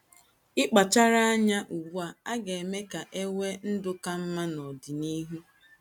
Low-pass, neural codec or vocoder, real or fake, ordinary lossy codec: 19.8 kHz; none; real; none